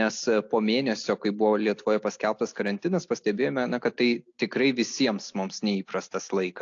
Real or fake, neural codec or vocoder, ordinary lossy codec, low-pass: real; none; AAC, 48 kbps; 7.2 kHz